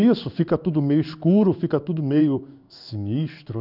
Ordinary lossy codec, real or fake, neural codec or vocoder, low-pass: none; fake; codec, 16 kHz in and 24 kHz out, 1 kbps, XY-Tokenizer; 5.4 kHz